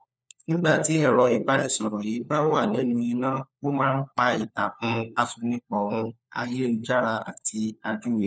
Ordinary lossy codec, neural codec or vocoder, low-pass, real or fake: none; codec, 16 kHz, 4 kbps, FunCodec, trained on LibriTTS, 50 frames a second; none; fake